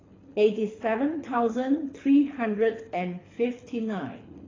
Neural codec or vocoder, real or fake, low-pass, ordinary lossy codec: codec, 24 kHz, 6 kbps, HILCodec; fake; 7.2 kHz; AAC, 32 kbps